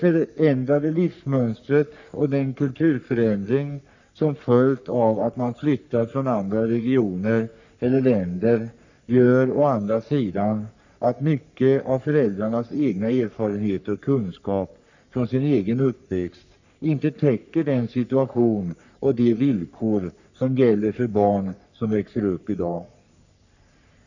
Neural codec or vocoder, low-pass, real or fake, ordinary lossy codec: codec, 44.1 kHz, 3.4 kbps, Pupu-Codec; 7.2 kHz; fake; none